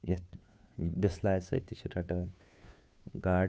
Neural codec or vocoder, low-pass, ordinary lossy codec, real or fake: codec, 16 kHz, 2 kbps, FunCodec, trained on Chinese and English, 25 frames a second; none; none; fake